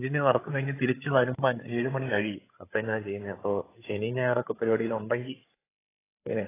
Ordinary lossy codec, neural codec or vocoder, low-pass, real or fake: AAC, 16 kbps; codec, 16 kHz, 16 kbps, FreqCodec, larger model; 3.6 kHz; fake